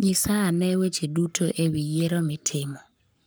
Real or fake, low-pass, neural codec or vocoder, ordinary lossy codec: fake; none; codec, 44.1 kHz, 7.8 kbps, Pupu-Codec; none